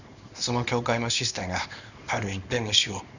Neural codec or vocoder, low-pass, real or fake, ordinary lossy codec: codec, 24 kHz, 0.9 kbps, WavTokenizer, small release; 7.2 kHz; fake; none